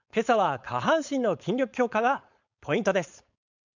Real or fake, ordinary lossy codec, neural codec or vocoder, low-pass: fake; none; codec, 16 kHz, 4.8 kbps, FACodec; 7.2 kHz